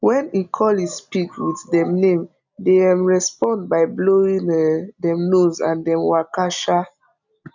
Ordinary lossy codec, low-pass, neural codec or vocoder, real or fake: none; 7.2 kHz; none; real